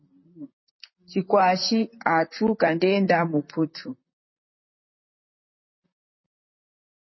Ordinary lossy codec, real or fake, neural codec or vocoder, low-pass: MP3, 24 kbps; fake; vocoder, 44.1 kHz, 128 mel bands, Pupu-Vocoder; 7.2 kHz